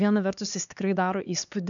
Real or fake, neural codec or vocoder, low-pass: fake; codec, 16 kHz, 2 kbps, X-Codec, HuBERT features, trained on LibriSpeech; 7.2 kHz